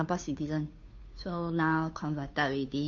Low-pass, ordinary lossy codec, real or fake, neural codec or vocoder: 7.2 kHz; AAC, 64 kbps; fake; codec, 16 kHz, 2 kbps, FunCodec, trained on Chinese and English, 25 frames a second